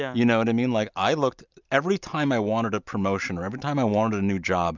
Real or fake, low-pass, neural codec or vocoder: real; 7.2 kHz; none